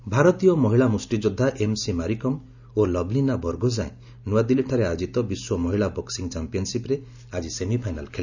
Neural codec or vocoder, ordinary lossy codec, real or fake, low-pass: none; none; real; 7.2 kHz